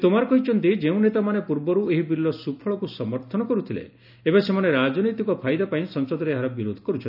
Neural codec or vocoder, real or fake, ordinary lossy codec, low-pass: none; real; none; 5.4 kHz